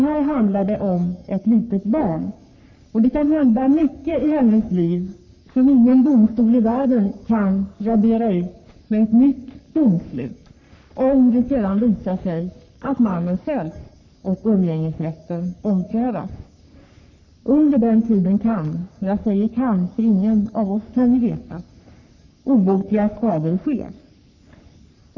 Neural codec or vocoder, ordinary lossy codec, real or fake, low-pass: codec, 44.1 kHz, 3.4 kbps, Pupu-Codec; none; fake; 7.2 kHz